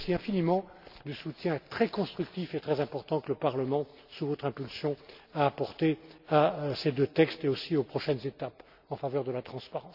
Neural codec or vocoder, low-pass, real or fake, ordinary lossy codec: none; 5.4 kHz; real; none